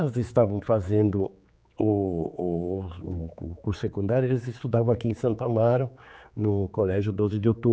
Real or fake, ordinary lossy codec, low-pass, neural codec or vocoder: fake; none; none; codec, 16 kHz, 4 kbps, X-Codec, HuBERT features, trained on general audio